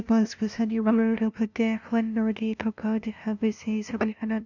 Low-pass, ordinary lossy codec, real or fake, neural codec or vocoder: 7.2 kHz; none; fake; codec, 16 kHz, 0.5 kbps, FunCodec, trained on LibriTTS, 25 frames a second